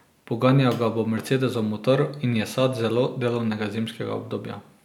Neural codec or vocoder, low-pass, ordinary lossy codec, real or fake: none; 19.8 kHz; none; real